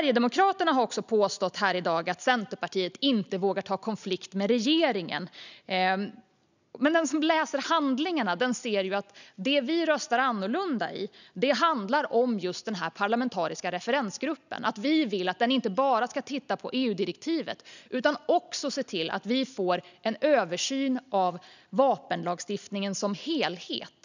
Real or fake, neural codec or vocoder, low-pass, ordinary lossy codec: real; none; 7.2 kHz; none